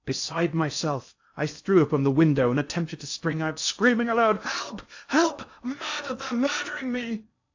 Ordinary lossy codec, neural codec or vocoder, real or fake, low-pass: AAC, 48 kbps; codec, 16 kHz in and 24 kHz out, 0.6 kbps, FocalCodec, streaming, 4096 codes; fake; 7.2 kHz